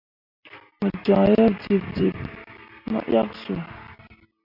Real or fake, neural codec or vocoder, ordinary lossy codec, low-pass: real; none; MP3, 48 kbps; 5.4 kHz